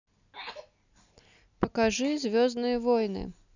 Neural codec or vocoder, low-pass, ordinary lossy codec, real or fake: none; 7.2 kHz; none; real